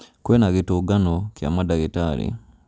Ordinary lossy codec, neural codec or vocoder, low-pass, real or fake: none; none; none; real